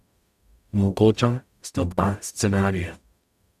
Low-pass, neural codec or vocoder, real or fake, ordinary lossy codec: 14.4 kHz; codec, 44.1 kHz, 0.9 kbps, DAC; fake; none